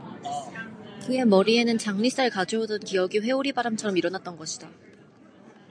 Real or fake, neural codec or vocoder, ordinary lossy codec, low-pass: real; none; AAC, 64 kbps; 9.9 kHz